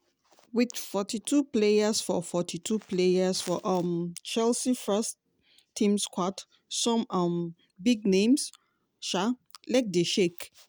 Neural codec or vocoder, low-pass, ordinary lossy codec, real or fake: none; none; none; real